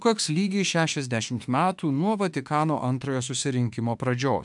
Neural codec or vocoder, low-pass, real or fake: autoencoder, 48 kHz, 32 numbers a frame, DAC-VAE, trained on Japanese speech; 10.8 kHz; fake